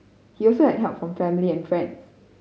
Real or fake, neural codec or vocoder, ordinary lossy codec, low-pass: real; none; none; none